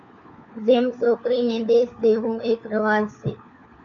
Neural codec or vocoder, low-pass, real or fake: codec, 16 kHz, 4 kbps, FunCodec, trained on LibriTTS, 50 frames a second; 7.2 kHz; fake